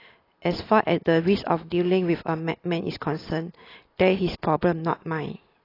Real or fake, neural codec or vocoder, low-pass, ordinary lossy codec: real; none; 5.4 kHz; AAC, 24 kbps